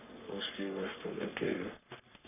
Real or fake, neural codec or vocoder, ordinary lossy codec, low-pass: fake; codec, 44.1 kHz, 3.4 kbps, Pupu-Codec; none; 3.6 kHz